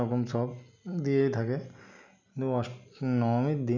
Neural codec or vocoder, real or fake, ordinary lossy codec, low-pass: none; real; none; 7.2 kHz